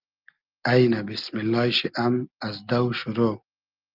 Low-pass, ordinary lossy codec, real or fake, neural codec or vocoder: 5.4 kHz; Opus, 24 kbps; real; none